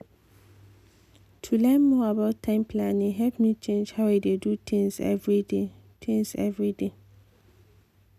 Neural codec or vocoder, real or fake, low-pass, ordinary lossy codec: none; real; 14.4 kHz; none